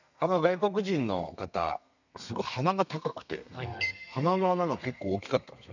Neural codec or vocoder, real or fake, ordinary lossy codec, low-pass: codec, 32 kHz, 1.9 kbps, SNAC; fake; none; 7.2 kHz